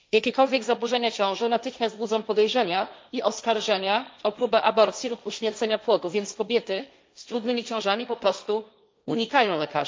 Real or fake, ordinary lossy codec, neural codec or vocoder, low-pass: fake; none; codec, 16 kHz, 1.1 kbps, Voila-Tokenizer; none